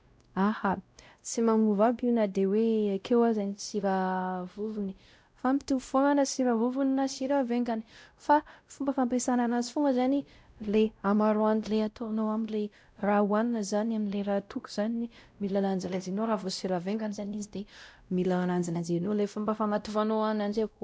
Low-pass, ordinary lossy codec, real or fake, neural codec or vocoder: none; none; fake; codec, 16 kHz, 0.5 kbps, X-Codec, WavLM features, trained on Multilingual LibriSpeech